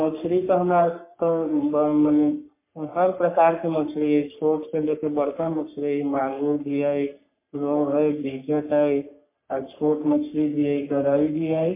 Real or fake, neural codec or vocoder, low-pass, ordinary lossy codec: fake; codec, 44.1 kHz, 3.4 kbps, Pupu-Codec; 3.6 kHz; MP3, 24 kbps